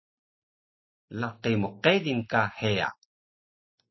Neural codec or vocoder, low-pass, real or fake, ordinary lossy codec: none; 7.2 kHz; real; MP3, 24 kbps